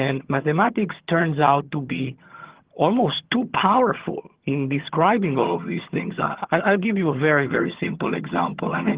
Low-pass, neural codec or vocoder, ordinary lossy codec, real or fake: 3.6 kHz; vocoder, 22.05 kHz, 80 mel bands, HiFi-GAN; Opus, 16 kbps; fake